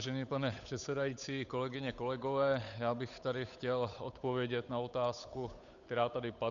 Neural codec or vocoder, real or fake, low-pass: codec, 16 kHz, 8 kbps, FunCodec, trained on Chinese and English, 25 frames a second; fake; 7.2 kHz